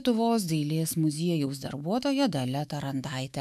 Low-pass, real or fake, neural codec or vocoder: 14.4 kHz; fake; autoencoder, 48 kHz, 128 numbers a frame, DAC-VAE, trained on Japanese speech